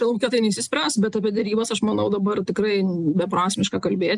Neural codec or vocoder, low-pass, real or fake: none; 10.8 kHz; real